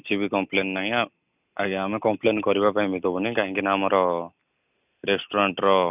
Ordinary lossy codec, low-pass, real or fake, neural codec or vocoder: none; 3.6 kHz; real; none